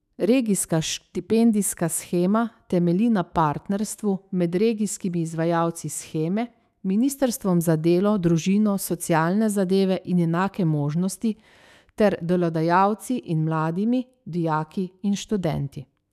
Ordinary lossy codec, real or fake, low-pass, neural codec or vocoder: none; fake; 14.4 kHz; autoencoder, 48 kHz, 128 numbers a frame, DAC-VAE, trained on Japanese speech